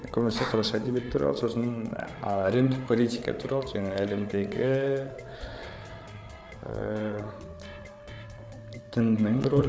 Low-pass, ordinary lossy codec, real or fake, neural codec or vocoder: none; none; fake; codec, 16 kHz, 8 kbps, FreqCodec, larger model